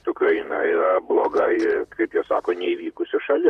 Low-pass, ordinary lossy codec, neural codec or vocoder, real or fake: 14.4 kHz; Opus, 64 kbps; vocoder, 44.1 kHz, 128 mel bands, Pupu-Vocoder; fake